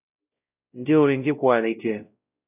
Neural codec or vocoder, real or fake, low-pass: codec, 16 kHz, 0.5 kbps, X-Codec, WavLM features, trained on Multilingual LibriSpeech; fake; 3.6 kHz